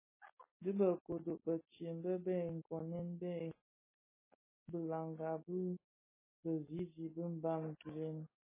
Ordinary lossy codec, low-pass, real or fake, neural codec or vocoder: MP3, 16 kbps; 3.6 kHz; real; none